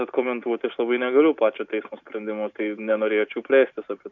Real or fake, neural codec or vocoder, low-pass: real; none; 7.2 kHz